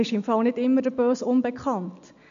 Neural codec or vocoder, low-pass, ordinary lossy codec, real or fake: none; 7.2 kHz; none; real